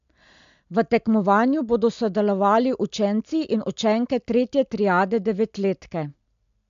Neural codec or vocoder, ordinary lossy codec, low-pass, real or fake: none; MP3, 64 kbps; 7.2 kHz; real